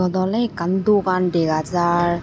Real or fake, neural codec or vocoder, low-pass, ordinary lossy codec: real; none; none; none